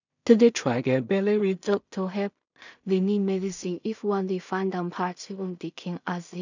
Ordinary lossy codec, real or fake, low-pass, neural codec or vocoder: none; fake; 7.2 kHz; codec, 16 kHz in and 24 kHz out, 0.4 kbps, LongCat-Audio-Codec, two codebook decoder